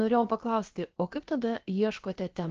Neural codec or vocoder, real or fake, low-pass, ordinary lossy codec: codec, 16 kHz, about 1 kbps, DyCAST, with the encoder's durations; fake; 7.2 kHz; Opus, 16 kbps